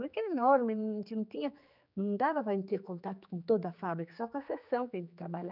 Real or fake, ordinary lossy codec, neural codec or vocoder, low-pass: fake; none; codec, 16 kHz, 4 kbps, X-Codec, HuBERT features, trained on general audio; 5.4 kHz